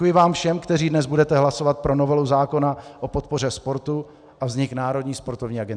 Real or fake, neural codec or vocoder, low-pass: real; none; 9.9 kHz